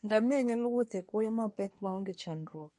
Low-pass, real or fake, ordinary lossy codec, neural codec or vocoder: 10.8 kHz; fake; MP3, 48 kbps; codec, 24 kHz, 1 kbps, SNAC